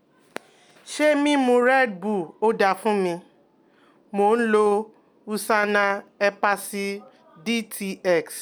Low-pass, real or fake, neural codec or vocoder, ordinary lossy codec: none; real; none; none